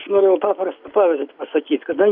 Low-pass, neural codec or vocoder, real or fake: 5.4 kHz; none; real